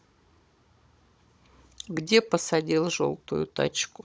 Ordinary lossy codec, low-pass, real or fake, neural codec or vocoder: none; none; fake; codec, 16 kHz, 16 kbps, FunCodec, trained on Chinese and English, 50 frames a second